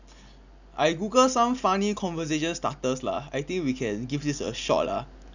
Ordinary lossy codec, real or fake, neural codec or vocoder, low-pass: none; real; none; 7.2 kHz